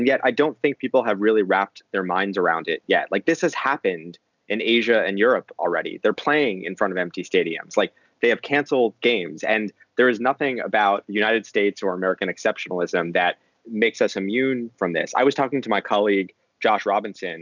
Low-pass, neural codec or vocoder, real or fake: 7.2 kHz; none; real